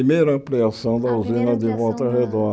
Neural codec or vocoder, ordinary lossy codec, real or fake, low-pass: none; none; real; none